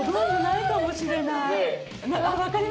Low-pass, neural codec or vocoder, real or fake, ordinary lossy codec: none; none; real; none